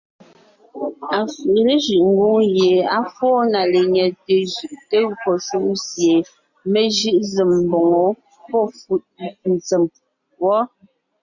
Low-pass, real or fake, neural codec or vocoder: 7.2 kHz; real; none